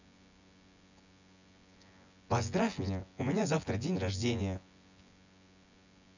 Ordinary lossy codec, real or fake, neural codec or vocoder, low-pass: none; fake; vocoder, 24 kHz, 100 mel bands, Vocos; 7.2 kHz